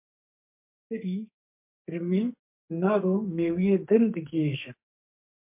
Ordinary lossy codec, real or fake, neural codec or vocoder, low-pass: MP3, 32 kbps; fake; codec, 44.1 kHz, 2.6 kbps, SNAC; 3.6 kHz